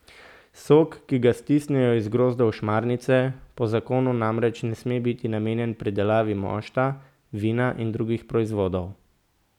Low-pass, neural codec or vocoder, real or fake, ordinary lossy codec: 19.8 kHz; none; real; none